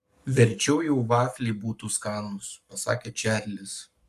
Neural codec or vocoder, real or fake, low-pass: codec, 44.1 kHz, 7.8 kbps, Pupu-Codec; fake; 14.4 kHz